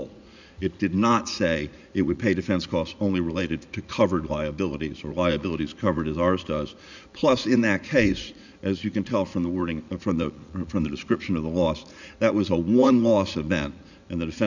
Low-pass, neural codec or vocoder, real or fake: 7.2 kHz; vocoder, 44.1 kHz, 80 mel bands, Vocos; fake